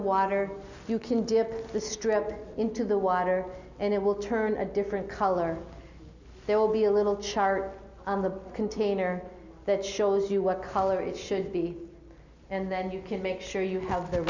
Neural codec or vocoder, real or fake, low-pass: none; real; 7.2 kHz